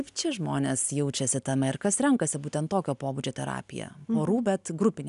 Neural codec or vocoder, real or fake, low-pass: none; real; 10.8 kHz